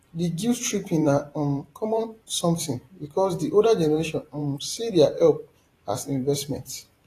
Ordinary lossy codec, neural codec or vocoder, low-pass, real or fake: AAC, 48 kbps; vocoder, 44.1 kHz, 128 mel bands every 512 samples, BigVGAN v2; 14.4 kHz; fake